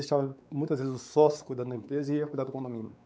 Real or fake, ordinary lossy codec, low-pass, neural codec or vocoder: fake; none; none; codec, 16 kHz, 4 kbps, X-Codec, WavLM features, trained on Multilingual LibriSpeech